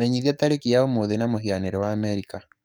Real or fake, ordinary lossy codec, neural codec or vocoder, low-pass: fake; none; codec, 44.1 kHz, 7.8 kbps, DAC; none